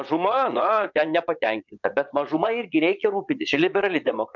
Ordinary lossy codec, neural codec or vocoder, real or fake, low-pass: MP3, 64 kbps; vocoder, 22.05 kHz, 80 mel bands, Vocos; fake; 7.2 kHz